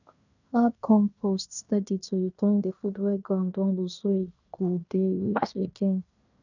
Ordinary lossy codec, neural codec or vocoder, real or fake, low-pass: none; codec, 16 kHz in and 24 kHz out, 0.9 kbps, LongCat-Audio-Codec, fine tuned four codebook decoder; fake; 7.2 kHz